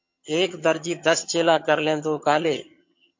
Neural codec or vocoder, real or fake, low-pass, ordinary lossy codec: vocoder, 22.05 kHz, 80 mel bands, HiFi-GAN; fake; 7.2 kHz; MP3, 48 kbps